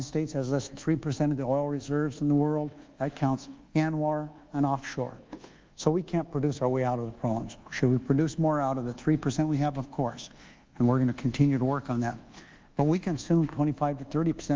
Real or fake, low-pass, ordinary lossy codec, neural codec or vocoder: fake; 7.2 kHz; Opus, 32 kbps; codec, 24 kHz, 1.2 kbps, DualCodec